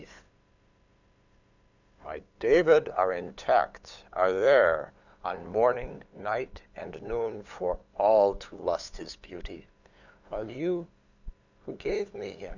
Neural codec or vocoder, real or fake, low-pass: codec, 16 kHz, 8 kbps, FunCodec, trained on LibriTTS, 25 frames a second; fake; 7.2 kHz